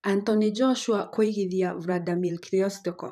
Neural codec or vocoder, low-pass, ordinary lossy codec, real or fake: vocoder, 44.1 kHz, 128 mel bands, Pupu-Vocoder; 14.4 kHz; none; fake